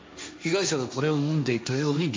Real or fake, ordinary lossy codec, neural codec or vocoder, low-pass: fake; none; codec, 16 kHz, 1.1 kbps, Voila-Tokenizer; none